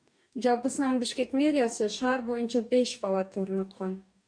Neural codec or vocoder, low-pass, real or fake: codec, 44.1 kHz, 2.6 kbps, DAC; 9.9 kHz; fake